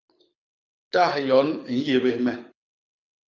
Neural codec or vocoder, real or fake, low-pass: codec, 24 kHz, 6 kbps, HILCodec; fake; 7.2 kHz